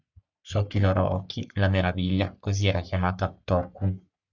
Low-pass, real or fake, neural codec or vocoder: 7.2 kHz; fake; codec, 44.1 kHz, 3.4 kbps, Pupu-Codec